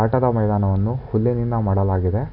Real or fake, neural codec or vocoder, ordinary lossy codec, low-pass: real; none; none; 5.4 kHz